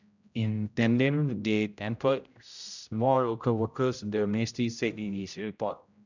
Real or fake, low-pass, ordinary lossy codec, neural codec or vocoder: fake; 7.2 kHz; none; codec, 16 kHz, 0.5 kbps, X-Codec, HuBERT features, trained on general audio